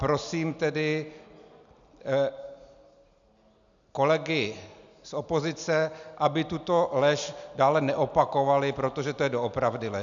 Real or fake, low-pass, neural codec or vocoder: real; 7.2 kHz; none